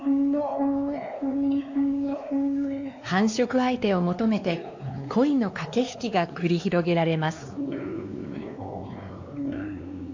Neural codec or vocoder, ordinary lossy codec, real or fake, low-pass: codec, 16 kHz, 2 kbps, X-Codec, WavLM features, trained on Multilingual LibriSpeech; AAC, 48 kbps; fake; 7.2 kHz